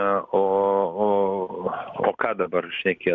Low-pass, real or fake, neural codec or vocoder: 7.2 kHz; real; none